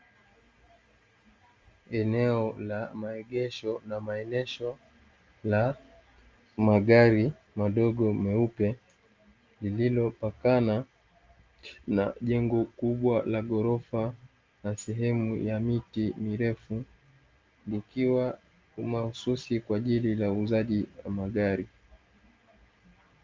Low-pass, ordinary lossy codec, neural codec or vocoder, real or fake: 7.2 kHz; Opus, 32 kbps; none; real